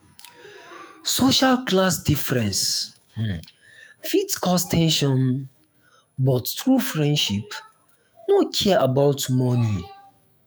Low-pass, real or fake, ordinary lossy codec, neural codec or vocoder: none; fake; none; autoencoder, 48 kHz, 128 numbers a frame, DAC-VAE, trained on Japanese speech